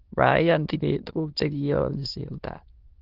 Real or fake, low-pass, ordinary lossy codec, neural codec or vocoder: fake; 5.4 kHz; Opus, 16 kbps; autoencoder, 22.05 kHz, a latent of 192 numbers a frame, VITS, trained on many speakers